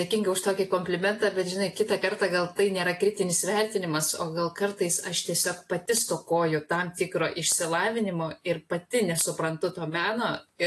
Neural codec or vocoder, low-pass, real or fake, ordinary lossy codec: none; 14.4 kHz; real; AAC, 48 kbps